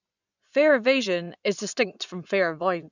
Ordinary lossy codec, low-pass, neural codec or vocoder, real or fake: none; 7.2 kHz; vocoder, 24 kHz, 100 mel bands, Vocos; fake